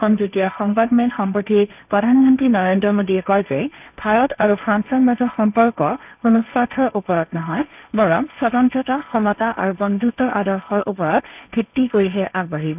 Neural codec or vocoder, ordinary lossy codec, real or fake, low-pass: codec, 16 kHz, 1.1 kbps, Voila-Tokenizer; none; fake; 3.6 kHz